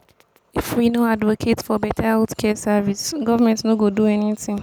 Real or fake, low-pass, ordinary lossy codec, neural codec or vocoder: real; none; none; none